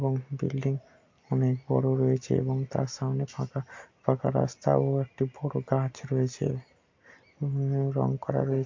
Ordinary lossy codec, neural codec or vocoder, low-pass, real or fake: MP3, 48 kbps; none; 7.2 kHz; real